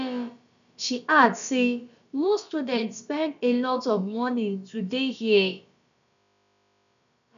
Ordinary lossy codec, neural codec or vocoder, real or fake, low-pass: none; codec, 16 kHz, about 1 kbps, DyCAST, with the encoder's durations; fake; 7.2 kHz